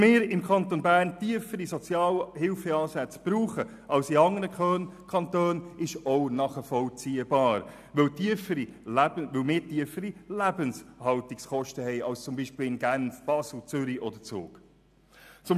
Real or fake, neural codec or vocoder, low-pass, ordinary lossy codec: real; none; 14.4 kHz; none